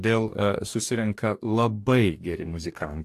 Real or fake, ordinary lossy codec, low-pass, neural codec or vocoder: fake; AAC, 64 kbps; 14.4 kHz; codec, 44.1 kHz, 2.6 kbps, DAC